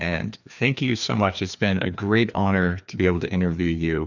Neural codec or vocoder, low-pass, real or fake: codec, 16 kHz, 2 kbps, FreqCodec, larger model; 7.2 kHz; fake